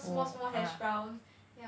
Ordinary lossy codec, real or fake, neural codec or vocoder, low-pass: none; real; none; none